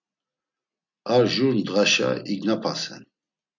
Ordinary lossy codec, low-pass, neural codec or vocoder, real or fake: MP3, 64 kbps; 7.2 kHz; none; real